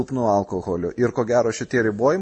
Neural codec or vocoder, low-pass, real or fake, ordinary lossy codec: none; 10.8 kHz; real; MP3, 32 kbps